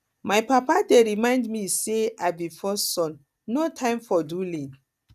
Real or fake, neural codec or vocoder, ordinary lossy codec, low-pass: real; none; none; 14.4 kHz